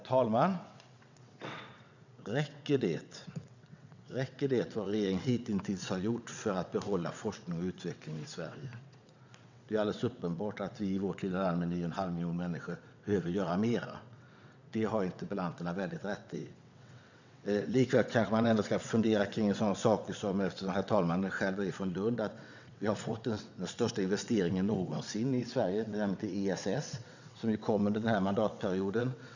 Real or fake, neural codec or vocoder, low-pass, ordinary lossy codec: fake; vocoder, 22.05 kHz, 80 mel bands, WaveNeXt; 7.2 kHz; none